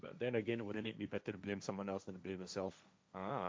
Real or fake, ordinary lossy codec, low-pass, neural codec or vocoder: fake; none; none; codec, 16 kHz, 1.1 kbps, Voila-Tokenizer